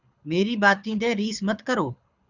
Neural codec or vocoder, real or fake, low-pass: codec, 24 kHz, 6 kbps, HILCodec; fake; 7.2 kHz